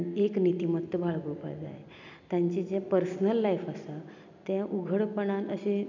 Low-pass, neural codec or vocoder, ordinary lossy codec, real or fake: 7.2 kHz; none; none; real